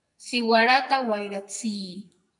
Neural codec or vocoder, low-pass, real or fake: codec, 44.1 kHz, 2.6 kbps, SNAC; 10.8 kHz; fake